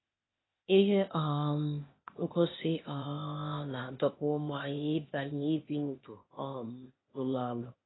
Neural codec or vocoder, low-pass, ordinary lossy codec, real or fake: codec, 16 kHz, 0.8 kbps, ZipCodec; 7.2 kHz; AAC, 16 kbps; fake